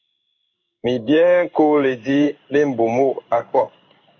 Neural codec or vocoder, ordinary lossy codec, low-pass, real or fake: codec, 16 kHz in and 24 kHz out, 1 kbps, XY-Tokenizer; MP3, 64 kbps; 7.2 kHz; fake